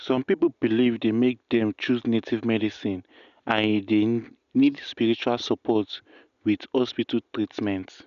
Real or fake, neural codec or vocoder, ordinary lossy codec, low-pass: real; none; none; 7.2 kHz